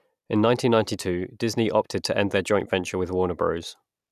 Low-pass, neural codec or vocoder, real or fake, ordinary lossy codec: 14.4 kHz; none; real; none